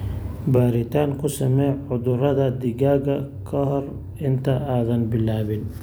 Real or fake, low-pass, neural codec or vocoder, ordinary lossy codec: fake; none; vocoder, 44.1 kHz, 128 mel bands every 256 samples, BigVGAN v2; none